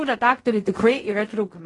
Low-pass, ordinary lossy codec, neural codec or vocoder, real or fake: 10.8 kHz; AAC, 32 kbps; codec, 16 kHz in and 24 kHz out, 0.4 kbps, LongCat-Audio-Codec, fine tuned four codebook decoder; fake